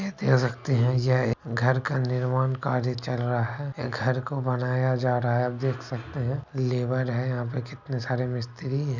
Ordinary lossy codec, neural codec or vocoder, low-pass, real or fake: none; none; 7.2 kHz; real